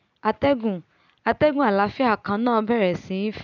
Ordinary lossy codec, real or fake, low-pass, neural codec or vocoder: none; real; 7.2 kHz; none